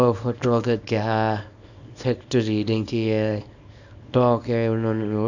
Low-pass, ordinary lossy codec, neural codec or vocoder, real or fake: 7.2 kHz; none; codec, 24 kHz, 0.9 kbps, WavTokenizer, small release; fake